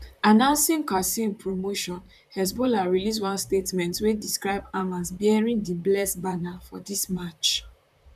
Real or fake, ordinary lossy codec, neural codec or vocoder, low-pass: fake; none; vocoder, 44.1 kHz, 128 mel bands, Pupu-Vocoder; 14.4 kHz